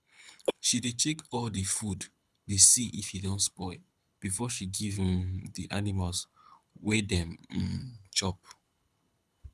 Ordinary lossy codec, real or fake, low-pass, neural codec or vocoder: none; fake; none; codec, 24 kHz, 6 kbps, HILCodec